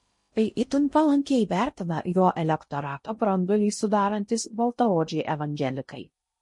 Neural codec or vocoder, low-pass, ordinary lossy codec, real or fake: codec, 16 kHz in and 24 kHz out, 0.6 kbps, FocalCodec, streaming, 2048 codes; 10.8 kHz; MP3, 48 kbps; fake